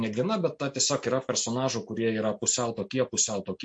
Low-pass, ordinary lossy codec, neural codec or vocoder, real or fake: 9.9 kHz; MP3, 48 kbps; none; real